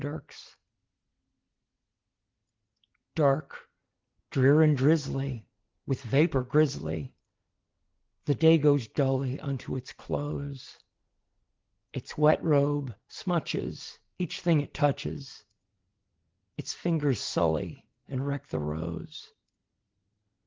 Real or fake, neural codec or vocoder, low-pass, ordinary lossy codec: real; none; 7.2 kHz; Opus, 16 kbps